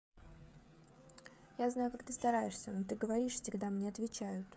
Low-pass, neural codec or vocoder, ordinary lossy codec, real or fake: none; codec, 16 kHz, 16 kbps, FreqCodec, smaller model; none; fake